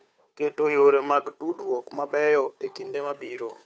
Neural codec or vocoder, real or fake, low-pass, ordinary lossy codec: codec, 16 kHz, 2 kbps, FunCodec, trained on Chinese and English, 25 frames a second; fake; none; none